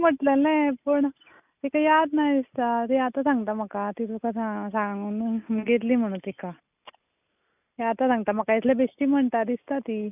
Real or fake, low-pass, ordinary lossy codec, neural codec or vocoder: real; 3.6 kHz; none; none